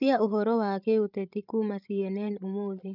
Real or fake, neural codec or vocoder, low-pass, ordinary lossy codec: fake; codec, 16 kHz, 16 kbps, FreqCodec, larger model; 5.4 kHz; none